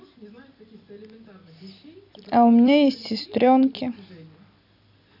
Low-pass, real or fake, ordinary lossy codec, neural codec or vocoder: 5.4 kHz; fake; none; vocoder, 44.1 kHz, 128 mel bands every 256 samples, BigVGAN v2